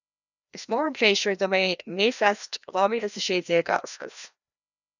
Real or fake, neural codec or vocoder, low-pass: fake; codec, 16 kHz, 1 kbps, FreqCodec, larger model; 7.2 kHz